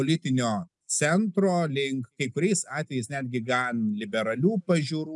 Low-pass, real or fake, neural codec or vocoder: 10.8 kHz; real; none